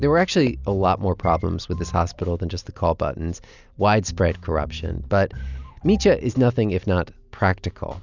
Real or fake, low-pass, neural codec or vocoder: real; 7.2 kHz; none